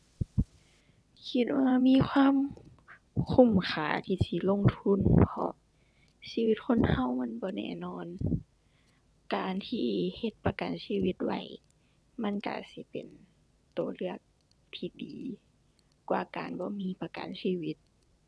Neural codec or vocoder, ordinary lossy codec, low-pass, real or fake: vocoder, 22.05 kHz, 80 mel bands, WaveNeXt; none; none; fake